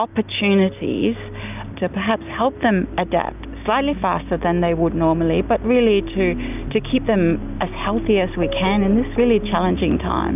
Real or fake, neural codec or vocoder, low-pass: real; none; 3.6 kHz